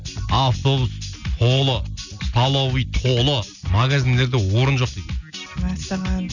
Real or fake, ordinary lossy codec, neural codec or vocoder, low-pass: real; none; none; 7.2 kHz